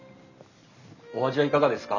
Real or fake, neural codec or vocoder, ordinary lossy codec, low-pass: real; none; none; 7.2 kHz